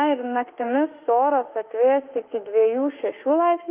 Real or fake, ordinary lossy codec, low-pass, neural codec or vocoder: fake; Opus, 24 kbps; 3.6 kHz; autoencoder, 48 kHz, 32 numbers a frame, DAC-VAE, trained on Japanese speech